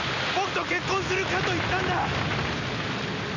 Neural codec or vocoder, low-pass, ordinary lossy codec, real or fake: none; 7.2 kHz; none; real